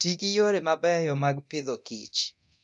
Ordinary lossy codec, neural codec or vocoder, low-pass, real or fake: none; codec, 24 kHz, 0.9 kbps, DualCodec; 10.8 kHz; fake